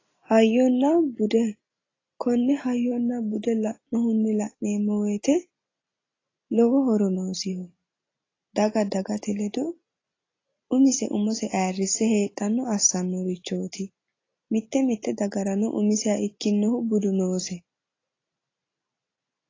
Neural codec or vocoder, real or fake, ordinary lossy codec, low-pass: none; real; AAC, 32 kbps; 7.2 kHz